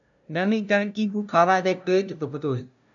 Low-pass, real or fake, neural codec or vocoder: 7.2 kHz; fake; codec, 16 kHz, 0.5 kbps, FunCodec, trained on LibriTTS, 25 frames a second